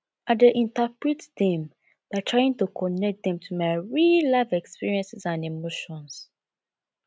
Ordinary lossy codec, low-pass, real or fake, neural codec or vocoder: none; none; real; none